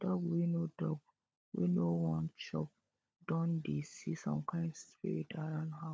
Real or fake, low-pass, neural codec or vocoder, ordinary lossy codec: fake; none; codec, 16 kHz, 16 kbps, FunCodec, trained on LibriTTS, 50 frames a second; none